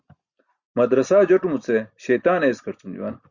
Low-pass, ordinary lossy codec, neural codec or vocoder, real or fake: 7.2 kHz; Opus, 64 kbps; none; real